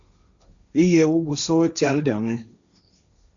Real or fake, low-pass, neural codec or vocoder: fake; 7.2 kHz; codec, 16 kHz, 1.1 kbps, Voila-Tokenizer